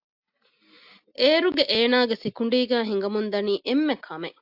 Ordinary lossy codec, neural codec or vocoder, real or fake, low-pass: AAC, 48 kbps; none; real; 5.4 kHz